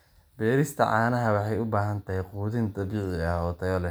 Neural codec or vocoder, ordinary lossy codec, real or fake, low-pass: none; none; real; none